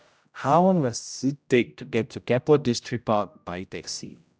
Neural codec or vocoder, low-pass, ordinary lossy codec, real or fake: codec, 16 kHz, 0.5 kbps, X-Codec, HuBERT features, trained on general audio; none; none; fake